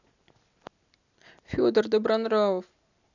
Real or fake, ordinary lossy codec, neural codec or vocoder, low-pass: real; none; none; 7.2 kHz